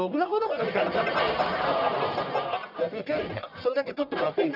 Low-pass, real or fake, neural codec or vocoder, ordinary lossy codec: 5.4 kHz; fake; codec, 44.1 kHz, 1.7 kbps, Pupu-Codec; none